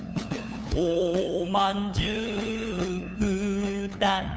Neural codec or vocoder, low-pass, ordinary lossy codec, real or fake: codec, 16 kHz, 8 kbps, FunCodec, trained on LibriTTS, 25 frames a second; none; none; fake